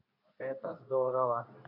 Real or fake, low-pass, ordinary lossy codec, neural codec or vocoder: fake; 5.4 kHz; none; codec, 24 kHz, 0.9 kbps, WavTokenizer, medium speech release version 2